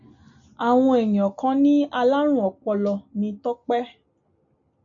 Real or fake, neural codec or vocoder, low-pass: real; none; 7.2 kHz